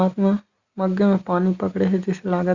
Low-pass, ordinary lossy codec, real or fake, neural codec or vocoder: 7.2 kHz; Opus, 64 kbps; real; none